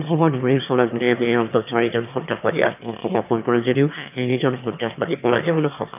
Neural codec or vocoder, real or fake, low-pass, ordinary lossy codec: autoencoder, 22.05 kHz, a latent of 192 numbers a frame, VITS, trained on one speaker; fake; 3.6 kHz; none